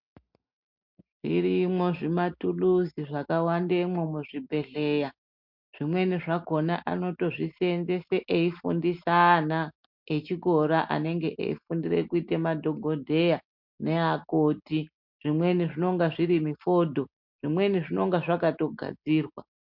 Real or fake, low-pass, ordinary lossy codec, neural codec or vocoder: real; 5.4 kHz; MP3, 48 kbps; none